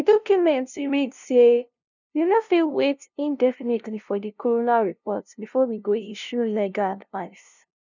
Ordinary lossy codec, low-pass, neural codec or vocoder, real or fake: none; 7.2 kHz; codec, 16 kHz, 0.5 kbps, FunCodec, trained on LibriTTS, 25 frames a second; fake